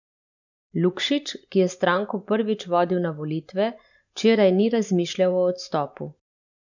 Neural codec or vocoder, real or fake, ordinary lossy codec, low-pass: vocoder, 44.1 kHz, 128 mel bands every 512 samples, BigVGAN v2; fake; none; 7.2 kHz